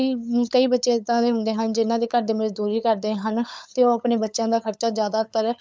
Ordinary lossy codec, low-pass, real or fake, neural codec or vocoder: none; none; fake; codec, 16 kHz, 8 kbps, FunCodec, trained on LibriTTS, 25 frames a second